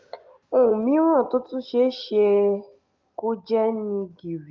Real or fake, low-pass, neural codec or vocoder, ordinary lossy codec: real; 7.2 kHz; none; Opus, 32 kbps